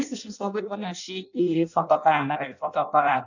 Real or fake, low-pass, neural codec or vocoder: fake; 7.2 kHz; codec, 16 kHz in and 24 kHz out, 0.6 kbps, FireRedTTS-2 codec